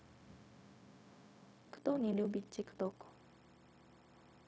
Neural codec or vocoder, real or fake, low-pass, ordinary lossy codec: codec, 16 kHz, 0.4 kbps, LongCat-Audio-Codec; fake; none; none